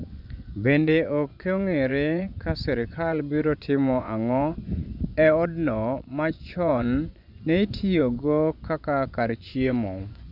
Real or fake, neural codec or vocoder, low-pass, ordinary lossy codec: fake; vocoder, 24 kHz, 100 mel bands, Vocos; 5.4 kHz; none